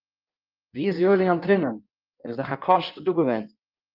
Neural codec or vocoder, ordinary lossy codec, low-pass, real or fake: codec, 16 kHz in and 24 kHz out, 1.1 kbps, FireRedTTS-2 codec; Opus, 24 kbps; 5.4 kHz; fake